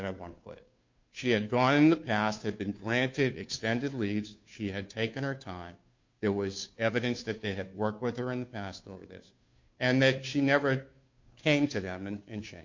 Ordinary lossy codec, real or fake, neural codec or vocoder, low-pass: MP3, 48 kbps; fake; codec, 16 kHz, 2 kbps, FunCodec, trained on Chinese and English, 25 frames a second; 7.2 kHz